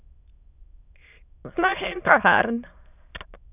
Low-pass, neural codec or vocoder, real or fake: 3.6 kHz; autoencoder, 22.05 kHz, a latent of 192 numbers a frame, VITS, trained on many speakers; fake